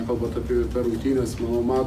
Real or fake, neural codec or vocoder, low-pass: real; none; 14.4 kHz